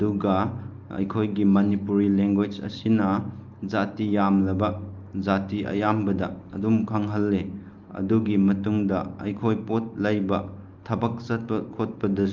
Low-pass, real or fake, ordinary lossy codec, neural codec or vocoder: 7.2 kHz; real; Opus, 24 kbps; none